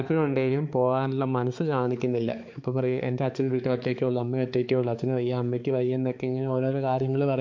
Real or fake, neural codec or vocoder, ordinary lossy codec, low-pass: fake; codec, 16 kHz, 4 kbps, X-Codec, HuBERT features, trained on balanced general audio; MP3, 48 kbps; 7.2 kHz